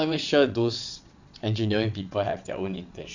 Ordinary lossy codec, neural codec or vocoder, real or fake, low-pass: none; vocoder, 22.05 kHz, 80 mel bands, WaveNeXt; fake; 7.2 kHz